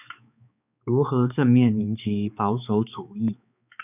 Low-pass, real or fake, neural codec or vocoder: 3.6 kHz; fake; codec, 16 kHz, 4 kbps, X-Codec, HuBERT features, trained on balanced general audio